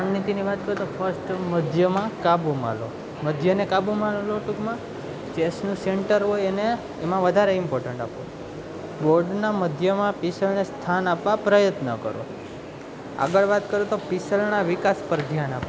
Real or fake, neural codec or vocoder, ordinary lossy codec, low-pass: real; none; none; none